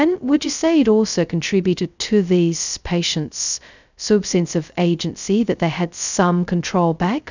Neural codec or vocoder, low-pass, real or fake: codec, 16 kHz, 0.2 kbps, FocalCodec; 7.2 kHz; fake